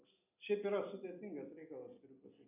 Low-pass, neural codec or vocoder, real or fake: 3.6 kHz; none; real